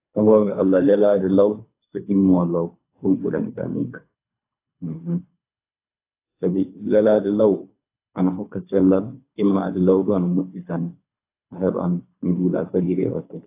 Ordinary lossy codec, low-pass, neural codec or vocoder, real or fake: AAC, 24 kbps; 3.6 kHz; codec, 24 kHz, 3 kbps, HILCodec; fake